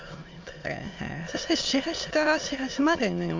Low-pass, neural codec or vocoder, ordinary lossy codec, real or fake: 7.2 kHz; autoencoder, 22.05 kHz, a latent of 192 numbers a frame, VITS, trained on many speakers; MP3, 48 kbps; fake